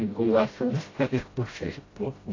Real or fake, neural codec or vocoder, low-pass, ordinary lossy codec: fake; codec, 16 kHz, 0.5 kbps, FreqCodec, smaller model; 7.2 kHz; AAC, 32 kbps